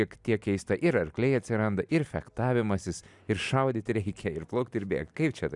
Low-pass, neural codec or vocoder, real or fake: 10.8 kHz; none; real